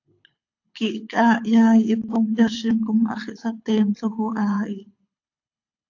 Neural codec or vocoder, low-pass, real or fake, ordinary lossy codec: codec, 24 kHz, 6 kbps, HILCodec; 7.2 kHz; fake; AAC, 48 kbps